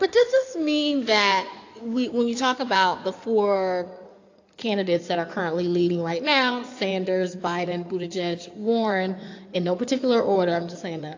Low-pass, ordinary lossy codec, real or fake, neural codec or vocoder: 7.2 kHz; AAC, 48 kbps; fake; codec, 16 kHz in and 24 kHz out, 2.2 kbps, FireRedTTS-2 codec